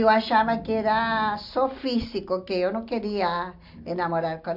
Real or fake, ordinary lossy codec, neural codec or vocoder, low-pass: real; MP3, 48 kbps; none; 5.4 kHz